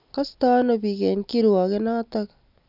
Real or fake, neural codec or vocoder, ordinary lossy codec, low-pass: real; none; none; 5.4 kHz